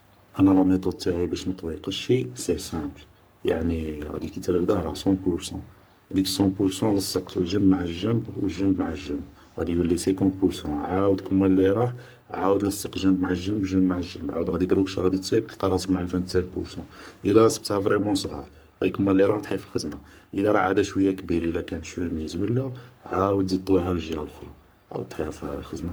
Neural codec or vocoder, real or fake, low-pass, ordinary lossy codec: codec, 44.1 kHz, 3.4 kbps, Pupu-Codec; fake; none; none